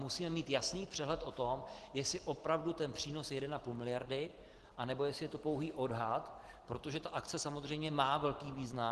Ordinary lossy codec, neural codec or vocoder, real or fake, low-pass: Opus, 16 kbps; none; real; 10.8 kHz